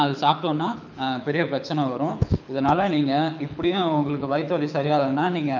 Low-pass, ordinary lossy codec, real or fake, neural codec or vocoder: 7.2 kHz; none; fake; codec, 24 kHz, 6 kbps, HILCodec